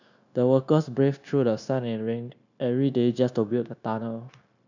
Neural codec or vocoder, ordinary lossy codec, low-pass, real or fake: codec, 16 kHz, 0.9 kbps, LongCat-Audio-Codec; none; 7.2 kHz; fake